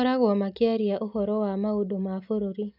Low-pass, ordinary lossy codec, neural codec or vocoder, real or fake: 5.4 kHz; none; none; real